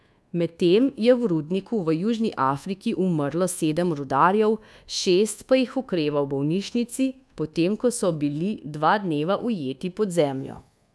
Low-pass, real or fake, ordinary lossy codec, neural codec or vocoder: none; fake; none; codec, 24 kHz, 1.2 kbps, DualCodec